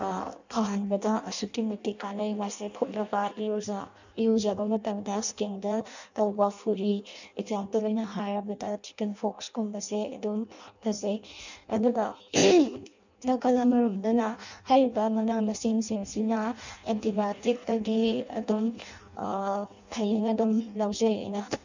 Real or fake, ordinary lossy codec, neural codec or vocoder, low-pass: fake; none; codec, 16 kHz in and 24 kHz out, 0.6 kbps, FireRedTTS-2 codec; 7.2 kHz